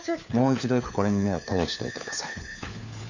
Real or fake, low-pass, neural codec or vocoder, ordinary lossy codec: fake; 7.2 kHz; codec, 16 kHz, 4 kbps, FunCodec, trained on LibriTTS, 50 frames a second; none